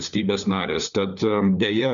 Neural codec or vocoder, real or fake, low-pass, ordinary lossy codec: codec, 16 kHz, 4 kbps, FunCodec, trained on LibriTTS, 50 frames a second; fake; 7.2 kHz; MP3, 96 kbps